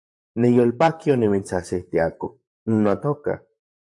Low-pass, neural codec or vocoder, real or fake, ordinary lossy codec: 10.8 kHz; vocoder, 44.1 kHz, 128 mel bands, Pupu-Vocoder; fake; AAC, 64 kbps